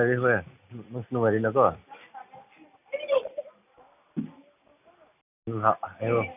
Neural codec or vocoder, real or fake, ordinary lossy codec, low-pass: none; real; none; 3.6 kHz